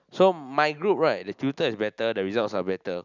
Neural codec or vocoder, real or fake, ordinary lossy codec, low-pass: none; real; none; 7.2 kHz